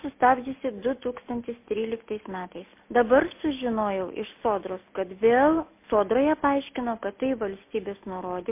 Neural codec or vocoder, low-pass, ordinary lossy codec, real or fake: none; 3.6 kHz; MP3, 24 kbps; real